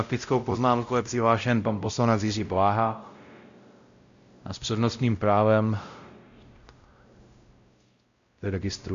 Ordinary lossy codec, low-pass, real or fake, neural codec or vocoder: Opus, 64 kbps; 7.2 kHz; fake; codec, 16 kHz, 0.5 kbps, X-Codec, WavLM features, trained on Multilingual LibriSpeech